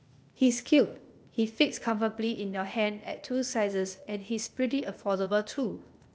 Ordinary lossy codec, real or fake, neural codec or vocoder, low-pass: none; fake; codec, 16 kHz, 0.8 kbps, ZipCodec; none